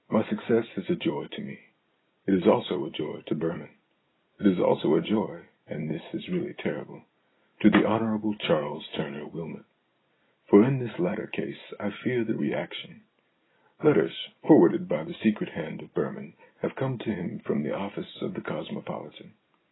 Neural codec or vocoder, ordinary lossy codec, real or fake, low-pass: none; AAC, 16 kbps; real; 7.2 kHz